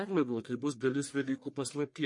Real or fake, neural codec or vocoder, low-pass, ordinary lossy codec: fake; codec, 44.1 kHz, 1.7 kbps, Pupu-Codec; 10.8 kHz; MP3, 48 kbps